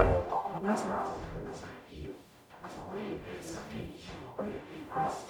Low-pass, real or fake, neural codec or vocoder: 19.8 kHz; fake; codec, 44.1 kHz, 0.9 kbps, DAC